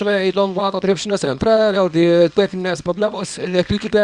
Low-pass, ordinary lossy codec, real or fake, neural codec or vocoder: 10.8 kHz; Opus, 64 kbps; fake; codec, 24 kHz, 0.9 kbps, WavTokenizer, small release